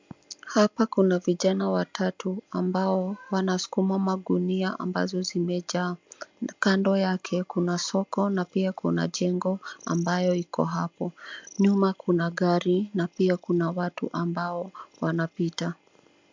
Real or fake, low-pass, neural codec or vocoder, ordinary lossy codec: real; 7.2 kHz; none; MP3, 64 kbps